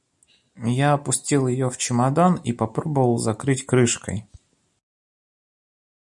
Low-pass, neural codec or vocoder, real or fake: 10.8 kHz; none; real